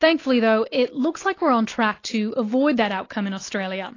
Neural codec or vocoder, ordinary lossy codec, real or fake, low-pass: none; AAC, 32 kbps; real; 7.2 kHz